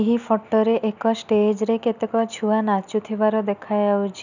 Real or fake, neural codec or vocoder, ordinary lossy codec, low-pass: real; none; none; 7.2 kHz